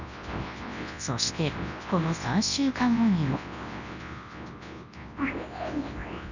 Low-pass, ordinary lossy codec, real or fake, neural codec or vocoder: 7.2 kHz; none; fake; codec, 24 kHz, 0.9 kbps, WavTokenizer, large speech release